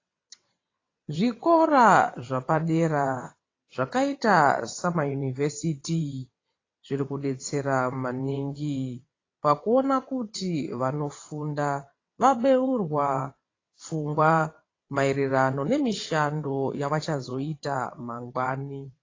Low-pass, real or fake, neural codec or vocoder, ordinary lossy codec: 7.2 kHz; fake; vocoder, 22.05 kHz, 80 mel bands, WaveNeXt; AAC, 32 kbps